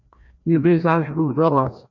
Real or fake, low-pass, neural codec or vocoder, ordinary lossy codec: fake; 7.2 kHz; codec, 16 kHz, 1 kbps, FreqCodec, larger model; MP3, 48 kbps